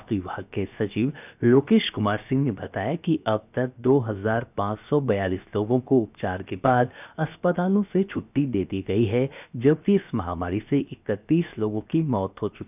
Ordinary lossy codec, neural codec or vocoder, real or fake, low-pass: none; codec, 16 kHz, 0.7 kbps, FocalCodec; fake; 3.6 kHz